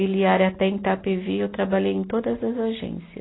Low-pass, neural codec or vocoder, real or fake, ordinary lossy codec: 7.2 kHz; none; real; AAC, 16 kbps